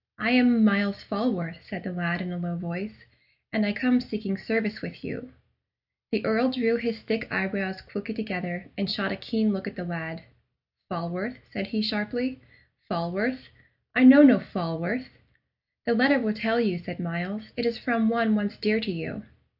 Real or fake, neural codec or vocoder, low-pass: real; none; 5.4 kHz